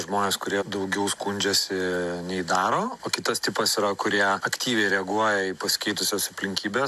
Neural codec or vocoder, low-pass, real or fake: none; 14.4 kHz; real